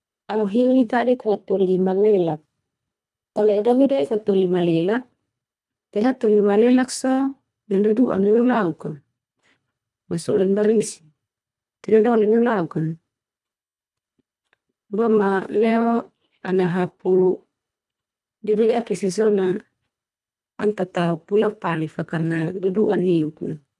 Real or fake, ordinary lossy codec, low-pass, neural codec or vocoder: fake; none; none; codec, 24 kHz, 1.5 kbps, HILCodec